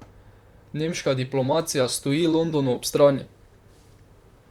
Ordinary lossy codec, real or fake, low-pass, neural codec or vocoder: Opus, 64 kbps; fake; 19.8 kHz; vocoder, 44.1 kHz, 128 mel bands, Pupu-Vocoder